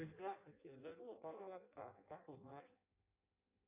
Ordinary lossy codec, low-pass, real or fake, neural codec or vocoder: AAC, 32 kbps; 3.6 kHz; fake; codec, 16 kHz in and 24 kHz out, 0.6 kbps, FireRedTTS-2 codec